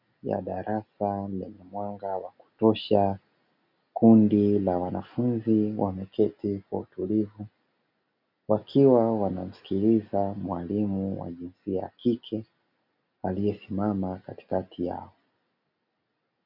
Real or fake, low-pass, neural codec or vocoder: real; 5.4 kHz; none